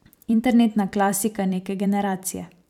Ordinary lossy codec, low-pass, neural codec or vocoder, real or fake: none; 19.8 kHz; vocoder, 44.1 kHz, 128 mel bands every 512 samples, BigVGAN v2; fake